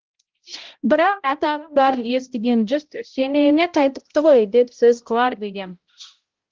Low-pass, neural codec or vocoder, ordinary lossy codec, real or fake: 7.2 kHz; codec, 16 kHz, 0.5 kbps, X-Codec, HuBERT features, trained on balanced general audio; Opus, 16 kbps; fake